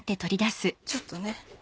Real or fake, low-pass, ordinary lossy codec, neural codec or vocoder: real; none; none; none